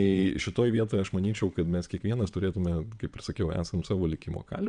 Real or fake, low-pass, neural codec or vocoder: fake; 9.9 kHz; vocoder, 22.05 kHz, 80 mel bands, WaveNeXt